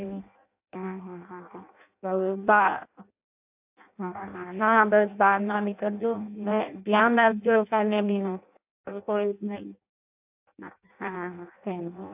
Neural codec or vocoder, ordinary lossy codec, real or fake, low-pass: codec, 16 kHz in and 24 kHz out, 0.6 kbps, FireRedTTS-2 codec; AAC, 32 kbps; fake; 3.6 kHz